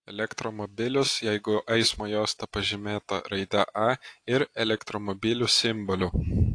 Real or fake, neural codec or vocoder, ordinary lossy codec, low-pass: real; none; AAC, 48 kbps; 9.9 kHz